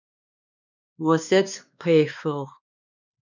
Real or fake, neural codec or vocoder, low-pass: fake; codec, 16 kHz, 2 kbps, X-Codec, WavLM features, trained on Multilingual LibriSpeech; 7.2 kHz